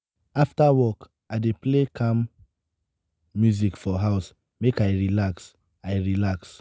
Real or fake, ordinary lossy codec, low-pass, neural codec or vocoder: real; none; none; none